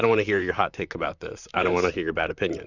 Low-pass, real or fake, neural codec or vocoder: 7.2 kHz; fake; vocoder, 44.1 kHz, 128 mel bands, Pupu-Vocoder